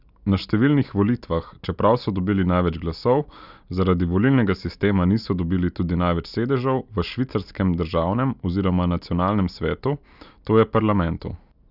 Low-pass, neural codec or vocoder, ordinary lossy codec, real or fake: 5.4 kHz; none; none; real